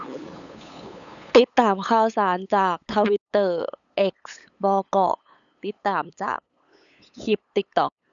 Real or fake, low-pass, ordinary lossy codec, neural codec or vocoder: fake; 7.2 kHz; none; codec, 16 kHz, 8 kbps, FunCodec, trained on LibriTTS, 25 frames a second